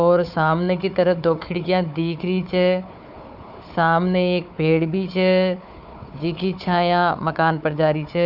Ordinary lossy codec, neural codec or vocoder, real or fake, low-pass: none; codec, 16 kHz, 4 kbps, FunCodec, trained on Chinese and English, 50 frames a second; fake; 5.4 kHz